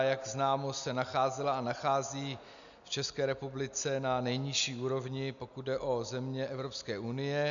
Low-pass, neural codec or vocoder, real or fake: 7.2 kHz; none; real